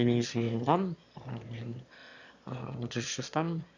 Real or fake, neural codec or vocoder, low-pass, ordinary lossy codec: fake; autoencoder, 22.05 kHz, a latent of 192 numbers a frame, VITS, trained on one speaker; 7.2 kHz; none